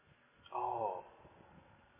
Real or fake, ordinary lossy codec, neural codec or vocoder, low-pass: real; MP3, 16 kbps; none; 3.6 kHz